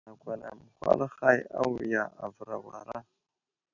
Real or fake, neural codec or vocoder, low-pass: real; none; 7.2 kHz